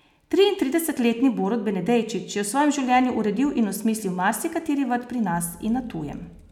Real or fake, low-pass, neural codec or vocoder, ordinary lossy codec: real; 19.8 kHz; none; none